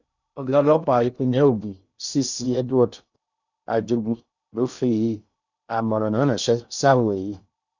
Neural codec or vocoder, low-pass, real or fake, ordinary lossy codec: codec, 16 kHz in and 24 kHz out, 0.6 kbps, FocalCodec, streaming, 2048 codes; 7.2 kHz; fake; none